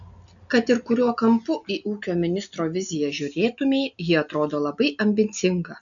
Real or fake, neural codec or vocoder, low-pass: real; none; 7.2 kHz